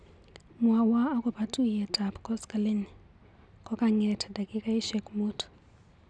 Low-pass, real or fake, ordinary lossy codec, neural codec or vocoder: 9.9 kHz; real; none; none